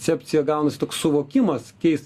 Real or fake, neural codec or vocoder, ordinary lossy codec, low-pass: real; none; Opus, 64 kbps; 14.4 kHz